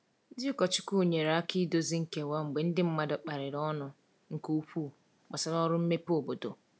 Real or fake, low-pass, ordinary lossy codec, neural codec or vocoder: real; none; none; none